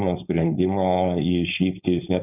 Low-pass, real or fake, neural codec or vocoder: 3.6 kHz; fake; codec, 16 kHz, 4.8 kbps, FACodec